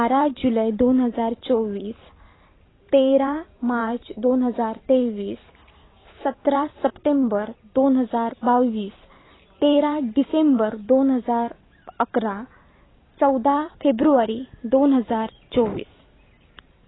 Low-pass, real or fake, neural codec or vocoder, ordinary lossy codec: 7.2 kHz; fake; vocoder, 44.1 kHz, 128 mel bands every 512 samples, BigVGAN v2; AAC, 16 kbps